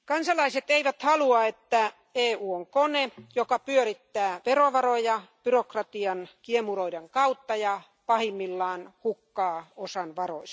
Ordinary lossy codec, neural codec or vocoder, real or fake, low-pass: none; none; real; none